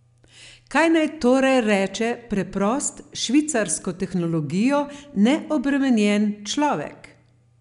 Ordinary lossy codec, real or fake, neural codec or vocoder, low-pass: none; real; none; 10.8 kHz